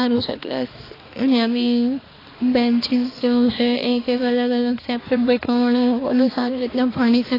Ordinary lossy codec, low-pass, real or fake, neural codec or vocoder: AAC, 24 kbps; 5.4 kHz; fake; codec, 16 kHz, 2 kbps, X-Codec, HuBERT features, trained on balanced general audio